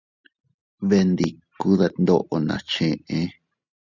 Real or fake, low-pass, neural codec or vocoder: real; 7.2 kHz; none